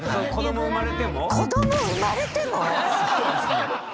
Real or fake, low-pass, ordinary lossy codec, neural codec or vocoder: real; none; none; none